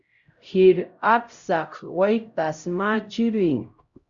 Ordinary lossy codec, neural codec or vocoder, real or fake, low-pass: Opus, 64 kbps; codec, 16 kHz, 0.5 kbps, X-Codec, HuBERT features, trained on LibriSpeech; fake; 7.2 kHz